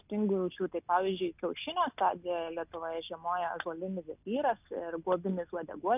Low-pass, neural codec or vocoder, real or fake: 3.6 kHz; none; real